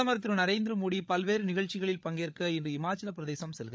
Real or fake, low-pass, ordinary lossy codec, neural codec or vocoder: fake; none; none; codec, 16 kHz, 8 kbps, FreqCodec, larger model